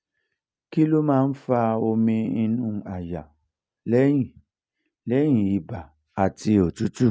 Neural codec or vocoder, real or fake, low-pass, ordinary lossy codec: none; real; none; none